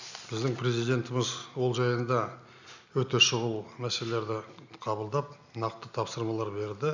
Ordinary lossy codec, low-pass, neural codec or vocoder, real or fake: none; 7.2 kHz; none; real